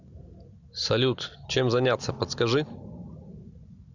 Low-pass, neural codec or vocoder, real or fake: 7.2 kHz; none; real